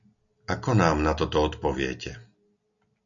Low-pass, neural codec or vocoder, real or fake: 7.2 kHz; none; real